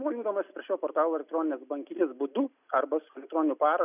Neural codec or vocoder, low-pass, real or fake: none; 3.6 kHz; real